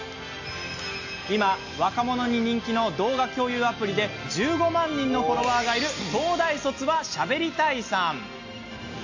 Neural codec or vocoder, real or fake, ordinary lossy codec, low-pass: none; real; AAC, 48 kbps; 7.2 kHz